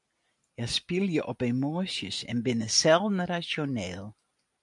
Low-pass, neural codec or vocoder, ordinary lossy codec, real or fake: 10.8 kHz; none; MP3, 96 kbps; real